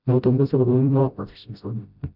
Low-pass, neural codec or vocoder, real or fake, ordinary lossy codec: 5.4 kHz; codec, 16 kHz, 0.5 kbps, FreqCodec, smaller model; fake; none